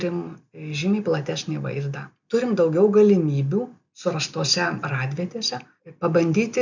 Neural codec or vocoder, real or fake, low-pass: none; real; 7.2 kHz